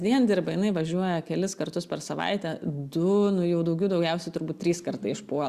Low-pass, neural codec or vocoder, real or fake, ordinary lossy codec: 14.4 kHz; none; real; Opus, 64 kbps